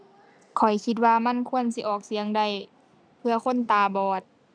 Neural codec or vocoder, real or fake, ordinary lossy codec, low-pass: none; real; none; 9.9 kHz